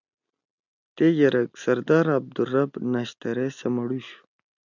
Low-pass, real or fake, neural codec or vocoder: 7.2 kHz; real; none